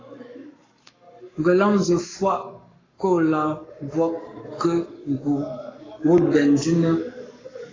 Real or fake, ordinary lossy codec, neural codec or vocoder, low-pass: fake; AAC, 32 kbps; codec, 44.1 kHz, 7.8 kbps, Pupu-Codec; 7.2 kHz